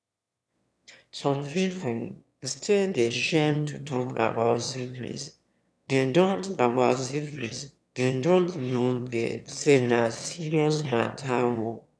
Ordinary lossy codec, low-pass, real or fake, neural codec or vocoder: none; none; fake; autoencoder, 22.05 kHz, a latent of 192 numbers a frame, VITS, trained on one speaker